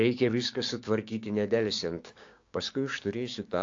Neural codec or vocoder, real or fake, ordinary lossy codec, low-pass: codec, 16 kHz, 6 kbps, DAC; fake; AAC, 48 kbps; 7.2 kHz